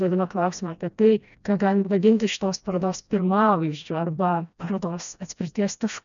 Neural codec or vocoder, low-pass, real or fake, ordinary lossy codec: codec, 16 kHz, 1 kbps, FreqCodec, smaller model; 7.2 kHz; fake; MP3, 96 kbps